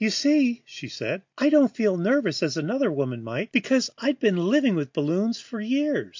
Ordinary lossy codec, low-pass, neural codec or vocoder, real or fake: MP3, 48 kbps; 7.2 kHz; none; real